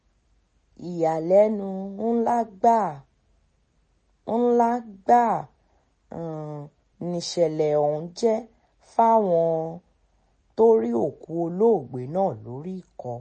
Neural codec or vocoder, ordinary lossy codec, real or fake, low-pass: none; MP3, 32 kbps; real; 10.8 kHz